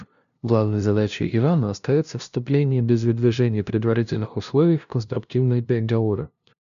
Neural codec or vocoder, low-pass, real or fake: codec, 16 kHz, 0.5 kbps, FunCodec, trained on LibriTTS, 25 frames a second; 7.2 kHz; fake